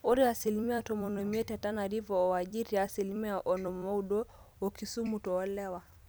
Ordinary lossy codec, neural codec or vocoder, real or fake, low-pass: none; vocoder, 44.1 kHz, 128 mel bands every 256 samples, BigVGAN v2; fake; none